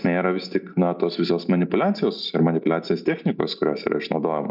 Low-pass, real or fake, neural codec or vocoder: 5.4 kHz; real; none